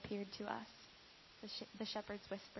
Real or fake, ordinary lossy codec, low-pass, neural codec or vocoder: real; MP3, 24 kbps; 7.2 kHz; none